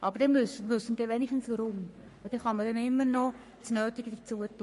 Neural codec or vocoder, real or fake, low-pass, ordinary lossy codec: codec, 44.1 kHz, 3.4 kbps, Pupu-Codec; fake; 14.4 kHz; MP3, 48 kbps